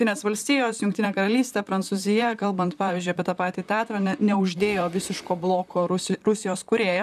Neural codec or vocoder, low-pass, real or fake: vocoder, 44.1 kHz, 128 mel bands, Pupu-Vocoder; 14.4 kHz; fake